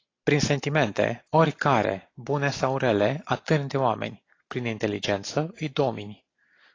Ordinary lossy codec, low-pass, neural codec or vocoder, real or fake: AAC, 32 kbps; 7.2 kHz; none; real